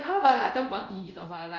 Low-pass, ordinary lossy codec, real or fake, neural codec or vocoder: 7.2 kHz; none; fake; codec, 24 kHz, 0.5 kbps, DualCodec